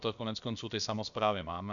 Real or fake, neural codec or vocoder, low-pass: fake; codec, 16 kHz, about 1 kbps, DyCAST, with the encoder's durations; 7.2 kHz